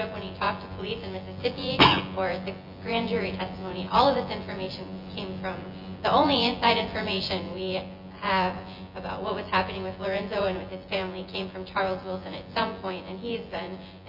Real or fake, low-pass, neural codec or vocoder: fake; 5.4 kHz; vocoder, 24 kHz, 100 mel bands, Vocos